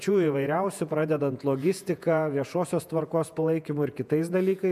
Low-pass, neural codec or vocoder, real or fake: 14.4 kHz; vocoder, 48 kHz, 128 mel bands, Vocos; fake